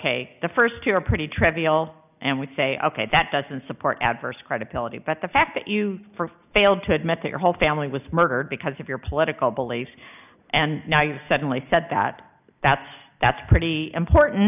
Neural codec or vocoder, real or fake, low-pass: none; real; 3.6 kHz